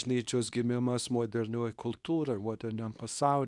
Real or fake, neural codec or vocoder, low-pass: fake; codec, 24 kHz, 0.9 kbps, WavTokenizer, medium speech release version 2; 10.8 kHz